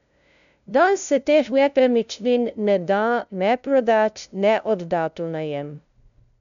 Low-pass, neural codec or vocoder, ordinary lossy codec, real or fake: 7.2 kHz; codec, 16 kHz, 0.5 kbps, FunCodec, trained on LibriTTS, 25 frames a second; none; fake